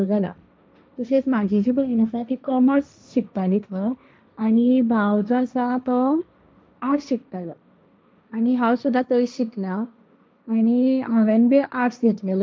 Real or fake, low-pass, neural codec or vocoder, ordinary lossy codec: fake; none; codec, 16 kHz, 1.1 kbps, Voila-Tokenizer; none